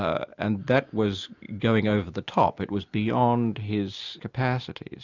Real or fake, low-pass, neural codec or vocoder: real; 7.2 kHz; none